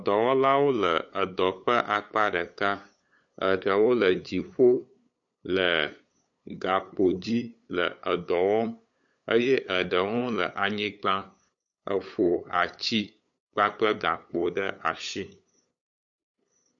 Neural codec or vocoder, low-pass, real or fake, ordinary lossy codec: codec, 16 kHz, 8 kbps, FunCodec, trained on LibriTTS, 25 frames a second; 7.2 kHz; fake; MP3, 48 kbps